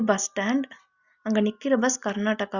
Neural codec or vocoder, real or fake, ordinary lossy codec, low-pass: none; real; Opus, 64 kbps; 7.2 kHz